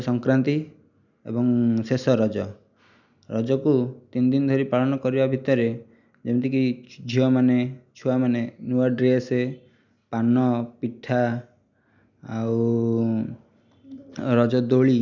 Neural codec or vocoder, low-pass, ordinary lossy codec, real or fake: none; 7.2 kHz; none; real